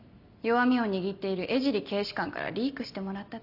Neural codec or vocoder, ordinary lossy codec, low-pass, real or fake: none; none; 5.4 kHz; real